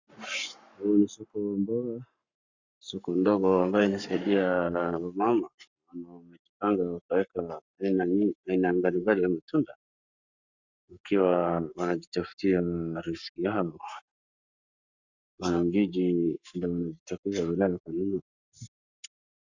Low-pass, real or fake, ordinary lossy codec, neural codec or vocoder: 7.2 kHz; fake; Opus, 64 kbps; codec, 44.1 kHz, 7.8 kbps, Pupu-Codec